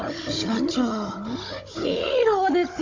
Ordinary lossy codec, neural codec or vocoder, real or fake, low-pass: MP3, 48 kbps; codec, 16 kHz, 16 kbps, FunCodec, trained on Chinese and English, 50 frames a second; fake; 7.2 kHz